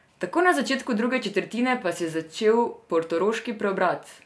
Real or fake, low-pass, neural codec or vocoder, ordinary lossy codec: real; none; none; none